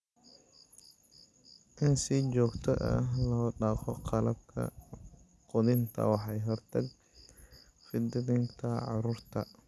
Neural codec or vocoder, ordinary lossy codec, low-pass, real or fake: none; none; none; real